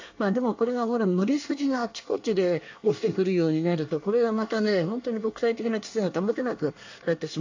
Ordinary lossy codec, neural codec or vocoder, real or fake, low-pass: AAC, 48 kbps; codec, 24 kHz, 1 kbps, SNAC; fake; 7.2 kHz